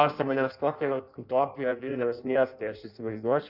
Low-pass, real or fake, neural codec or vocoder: 5.4 kHz; fake; codec, 16 kHz in and 24 kHz out, 0.6 kbps, FireRedTTS-2 codec